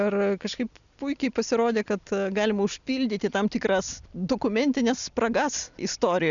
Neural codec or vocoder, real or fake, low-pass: none; real; 7.2 kHz